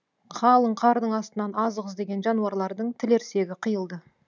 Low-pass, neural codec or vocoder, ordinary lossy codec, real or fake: 7.2 kHz; none; none; real